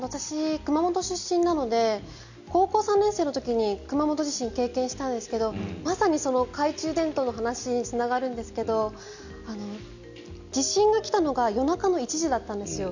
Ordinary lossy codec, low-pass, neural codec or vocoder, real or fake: none; 7.2 kHz; none; real